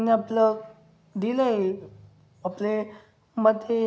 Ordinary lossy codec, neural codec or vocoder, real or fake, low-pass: none; none; real; none